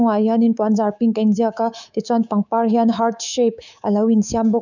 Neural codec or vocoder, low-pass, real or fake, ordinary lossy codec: codec, 24 kHz, 3.1 kbps, DualCodec; 7.2 kHz; fake; none